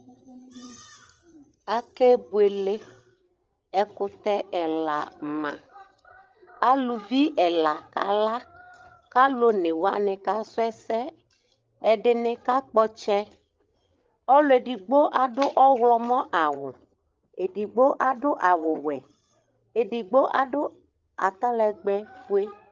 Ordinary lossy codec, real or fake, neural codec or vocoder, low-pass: Opus, 24 kbps; fake; codec, 16 kHz, 8 kbps, FreqCodec, larger model; 7.2 kHz